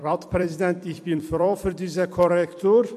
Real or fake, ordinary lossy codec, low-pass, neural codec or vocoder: fake; MP3, 64 kbps; 14.4 kHz; vocoder, 44.1 kHz, 128 mel bands every 256 samples, BigVGAN v2